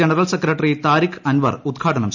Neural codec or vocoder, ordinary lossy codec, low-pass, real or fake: none; none; 7.2 kHz; real